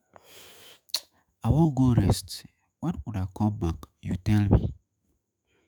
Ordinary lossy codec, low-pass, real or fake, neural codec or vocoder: none; none; fake; autoencoder, 48 kHz, 128 numbers a frame, DAC-VAE, trained on Japanese speech